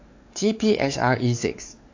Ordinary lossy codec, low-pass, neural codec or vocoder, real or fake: AAC, 48 kbps; 7.2 kHz; codec, 16 kHz, 4 kbps, X-Codec, WavLM features, trained on Multilingual LibriSpeech; fake